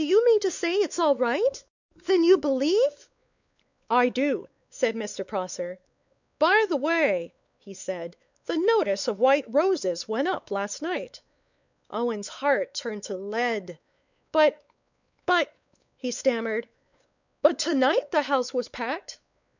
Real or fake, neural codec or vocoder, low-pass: fake; codec, 16 kHz, 4 kbps, X-Codec, WavLM features, trained on Multilingual LibriSpeech; 7.2 kHz